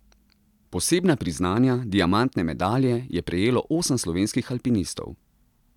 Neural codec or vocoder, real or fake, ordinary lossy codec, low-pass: none; real; none; 19.8 kHz